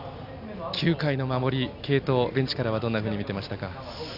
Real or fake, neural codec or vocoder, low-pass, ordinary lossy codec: real; none; 5.4 kHz; none